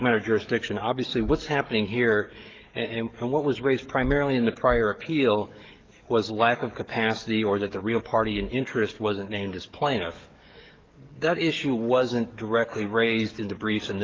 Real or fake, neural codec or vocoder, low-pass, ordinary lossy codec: fake; codec, 44.1 kHz, 7.8 kbps, DAC; 7.2 kHz; Opus, 32 kbps